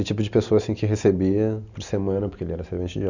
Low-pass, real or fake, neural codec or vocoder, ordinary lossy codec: 7.2 kHz; real; none; none